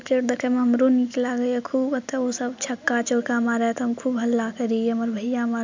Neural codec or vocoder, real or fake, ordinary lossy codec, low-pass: none; real; MP3, 64 kbps; 7.2 kHz